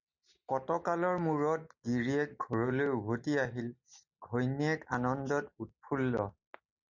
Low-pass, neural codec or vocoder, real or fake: 7.2 kHz; none; real